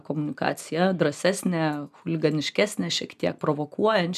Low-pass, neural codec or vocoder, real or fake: 14.4 kHz; none; real